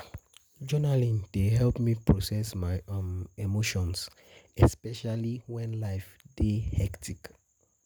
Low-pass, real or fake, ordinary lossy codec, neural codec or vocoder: none; real; none; none